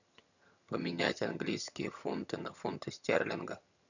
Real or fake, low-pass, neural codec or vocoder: fake; 7.2 kHz; vocoder, 22.05 kHz, 80 mel bands, HiFi-GAN